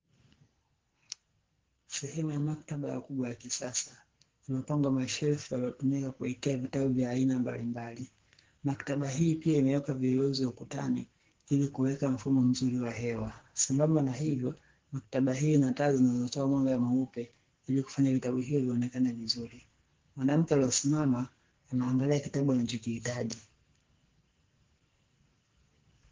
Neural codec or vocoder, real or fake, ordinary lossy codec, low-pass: codec, 44.1 kHz, 2.6 kbps, SNAC; fake; Opus, 16 kbps; 7.2 kHz